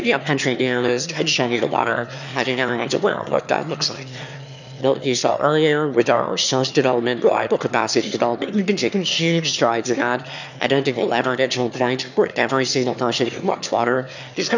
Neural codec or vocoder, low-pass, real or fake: autoencoder, 22.05 kHz, a latent of 192 numbers a frame, VITS, trained on one speaker; 7.2 kHz; fake